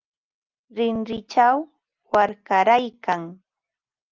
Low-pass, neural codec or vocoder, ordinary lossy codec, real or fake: 7.2 kHz; none; Opus, 24 kbps; real